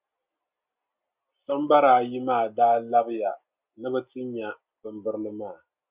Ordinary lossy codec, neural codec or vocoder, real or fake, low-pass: Opus, 64 kbps; none; real; 3.6 kHz